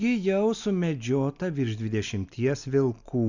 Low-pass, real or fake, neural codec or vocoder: 7.2 kHz; real; none